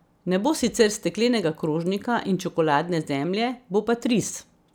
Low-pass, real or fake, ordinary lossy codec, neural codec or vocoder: none; real; none; none